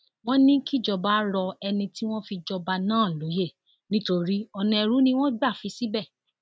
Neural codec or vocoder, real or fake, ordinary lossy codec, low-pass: none; real; none; none